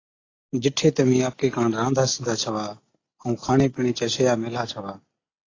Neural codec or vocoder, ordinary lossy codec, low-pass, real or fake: none; AAC, 32 kbps; 7.2 kHz; real